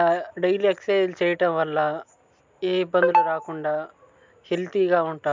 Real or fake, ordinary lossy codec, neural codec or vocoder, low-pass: real; MP3, 64 kbps; none; 7.2 kHz